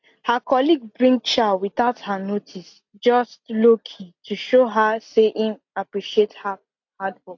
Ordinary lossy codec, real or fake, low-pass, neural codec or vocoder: AAC, 48 kbps; real; 7.2 kHz; none